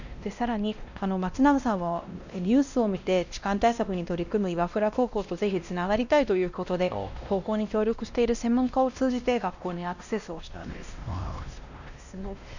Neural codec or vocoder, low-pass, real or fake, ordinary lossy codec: codec, 16 kHz, 1 kbps, X-Codec, WavLM features, trained on Multilingual LibriSpeech; 7.2 kHz; fake; none